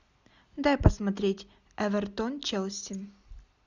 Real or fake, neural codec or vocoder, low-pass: real; none; 7.2 kHz